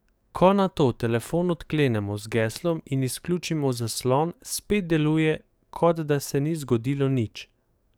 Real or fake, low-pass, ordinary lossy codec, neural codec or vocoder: fake; none; none; codec, 44.1 kHz, 7.8 kbps, DAC